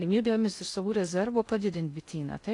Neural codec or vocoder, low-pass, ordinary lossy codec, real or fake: codec, 16 kHz in and 24 kHz out, 0.6 kbps, FocalCodec, streaming, 2048 codes; 10.8 kHz; AAC, 48 kbps; fake